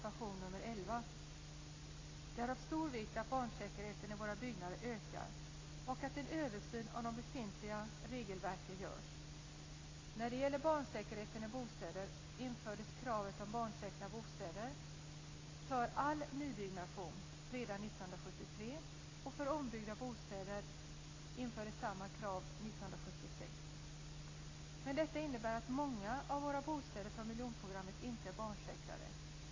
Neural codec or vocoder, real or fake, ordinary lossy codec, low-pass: none; real; AAC, 32 kbps; 7.2 kHz